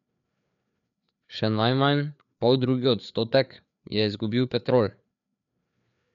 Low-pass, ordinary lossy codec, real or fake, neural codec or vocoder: 7.2 kHz; none; fake; codec, 16 kHz, 4 kbps, FreqCodec, larger model